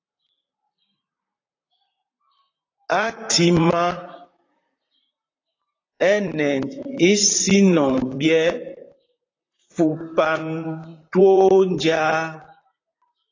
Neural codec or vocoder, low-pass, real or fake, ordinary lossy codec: vocoder, 44.1 kHz, 128 mel bands, Pupu-Vocoder; 7.2 kHz; fake; AAC, 32 kbps